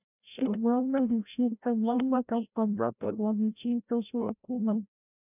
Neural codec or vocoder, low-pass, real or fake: codec, 16 kHz, 0.5 kbps, FreqCodec, larger model; 3.6 kHz; fake